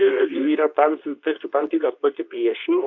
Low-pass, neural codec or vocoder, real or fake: 7.2 kHz; codec, 24 kHz, 0.9 kbps, WavTokenizer, medium speech release version 2; fake